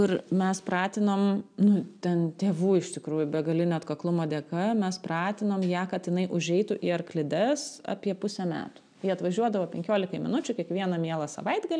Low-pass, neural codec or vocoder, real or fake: 9.9 kHz; none; real